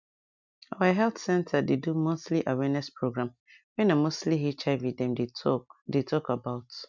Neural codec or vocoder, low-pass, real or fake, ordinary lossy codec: none; 7.2 kHz; real; none